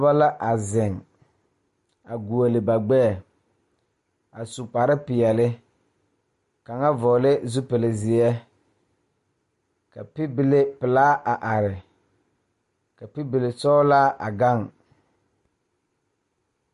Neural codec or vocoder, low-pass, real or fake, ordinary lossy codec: none; 14.4 kHz; real; MP3, 48 kbps